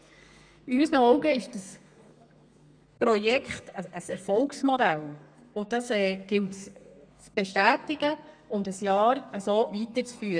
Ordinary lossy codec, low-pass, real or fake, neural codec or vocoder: none; 9.9 kHz; fake; codec, 32 kHz, 1.9 kbps, SNAC